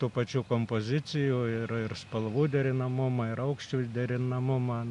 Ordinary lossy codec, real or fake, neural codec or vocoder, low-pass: MP3, 96 kbps; real; none; 10.8 kHz